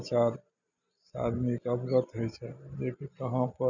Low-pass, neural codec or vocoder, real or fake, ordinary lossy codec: 7.2 kHz; none; real; none